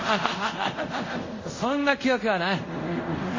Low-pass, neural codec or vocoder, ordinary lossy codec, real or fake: 7.2 kHz; codec, 24 kHz, 0.5 kbps, DualCodec; MP3, 32 kbps; fake